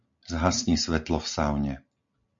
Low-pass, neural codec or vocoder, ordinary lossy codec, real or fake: 7.2 kHz; none; MP3, 48 kbps; real